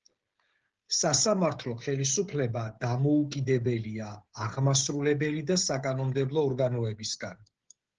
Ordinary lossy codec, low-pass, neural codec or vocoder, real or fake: Opus, 16 kbps; 7.2 kHz; codec, 16 kHz, 16 kbps, FreqCodec, smaller model; fake